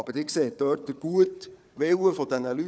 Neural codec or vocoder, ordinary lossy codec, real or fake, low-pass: codec, 16 kHz, 4 kbps, FunCodec, trained on Chinese and English, 50 frames a second; none; fake; none